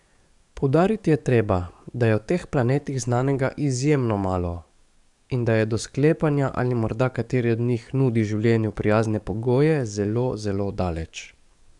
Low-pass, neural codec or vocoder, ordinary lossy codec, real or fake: 10.8 kHz; codec, 44.1 kHz, 7.8 kbps, DAC; none; fake